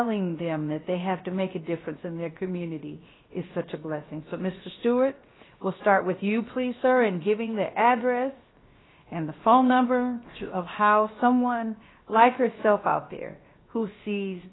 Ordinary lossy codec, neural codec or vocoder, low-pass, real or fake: AAC, 16 kbps; codec, 16 kHz, 0.7 kbps, FocalCodec; 7.2 kHz; fake